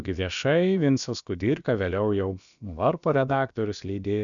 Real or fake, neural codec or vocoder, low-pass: fake; codec, 16 kHz, about 1 kbps, DyCAST, with the encoder's durations; 7.2 kHz